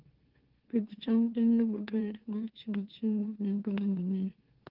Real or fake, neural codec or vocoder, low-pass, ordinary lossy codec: fake; autoencoder, 44.1 kHz, a latent of 192 numbers a frame, MeloTTS; 5.4 kHz; Opus, 16 kbps